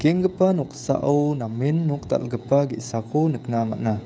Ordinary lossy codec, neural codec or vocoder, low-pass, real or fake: none; codec, 16 kHz, 16 kbps, FreqCodec, smaller model; none; fake